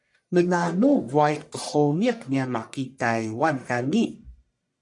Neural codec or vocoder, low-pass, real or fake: codec, 44.1 kHz, 1.7 kbps, Pupu-Codec; 10.8 kHz; fake